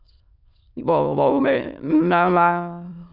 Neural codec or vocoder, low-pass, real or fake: autoencoder, 22.05 kHz, a latent of 192 numbers a frame, VITS, trained on many speakers; 5.4 kHz; fake